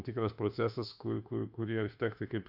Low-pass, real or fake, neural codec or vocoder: 5.4 kHz; fake; autoencoder, 48 kHz, 32 numbers a frame, DAC-VAE, trained on Japanese speech